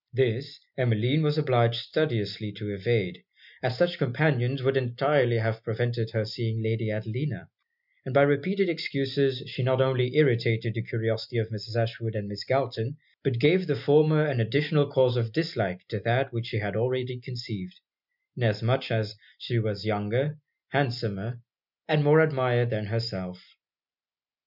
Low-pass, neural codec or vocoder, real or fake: 5.4 kHz; none; real